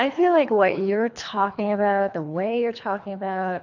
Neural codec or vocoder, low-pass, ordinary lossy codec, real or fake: codec, 24 kHz, 3 kbps, HILCodec; 7.2 kHz; AAC, 48 kbps; fake